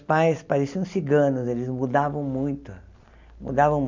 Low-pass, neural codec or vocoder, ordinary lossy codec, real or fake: 7.2 kHz; none; MP3, 64 kbps; real